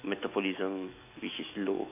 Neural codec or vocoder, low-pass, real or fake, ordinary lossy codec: autoencoder, 48 kHz, 128 numbers a frame, DAC-VAE, trained on Japanese speech; 3.6 kHz; fake; AAC, 24 kbps